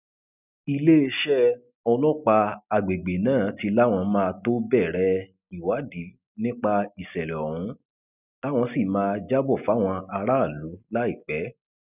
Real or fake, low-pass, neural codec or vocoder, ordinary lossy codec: real; 3.6 kHz; none; none